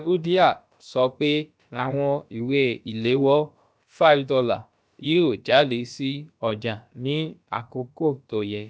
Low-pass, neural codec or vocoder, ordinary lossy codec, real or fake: none; codec, 16 kHz, about 1 kbps, DyCAST, with the encoder's durations; none; fake